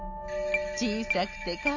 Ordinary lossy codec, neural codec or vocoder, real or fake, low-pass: AAC, 48 kbps; none; real; 7.2 kHz